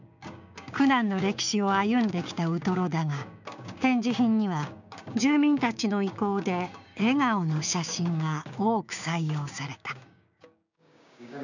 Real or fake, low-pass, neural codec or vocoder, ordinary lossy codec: fake; 7.2 kHz; codec, 16 kHz, 6 kbps, DAC; none